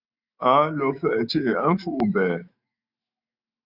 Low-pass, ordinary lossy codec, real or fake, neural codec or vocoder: 5.4 kHz; Opus, 64 kbps; fake; autoencoder, 48 kHz, 128 numbers a frame, DAC-VAE, trained on Japanese speech